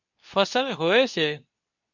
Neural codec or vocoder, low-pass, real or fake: codec, 24 kHz, 0.9 kbps, WavTokenizer, medium speech release version 2; 7.2 kHz; fake